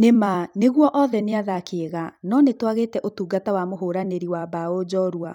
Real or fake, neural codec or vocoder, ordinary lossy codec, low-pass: fake; vocoder, 44.1 kHz, 128 mel bands every 256 samples, BigVGAN v2; none; 19.8 kHz